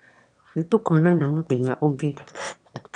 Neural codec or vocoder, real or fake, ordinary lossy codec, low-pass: autoencoder, 22.05 kHz, a latent of 192 numbers a frame, VITS, trained on one speaker; fake; none; 9.9 kHz